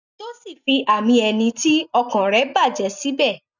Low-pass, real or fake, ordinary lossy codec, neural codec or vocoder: 7.2 kHz; real; none; none